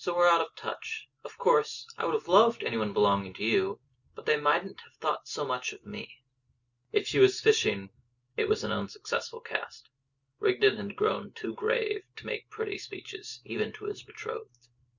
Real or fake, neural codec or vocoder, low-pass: real; none; 7.2 kHz